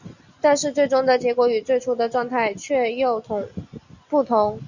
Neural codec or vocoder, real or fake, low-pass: none; real; 7.2 kHz